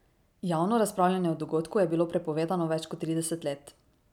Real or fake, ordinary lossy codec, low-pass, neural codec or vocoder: real; none; 19.8 kHz; none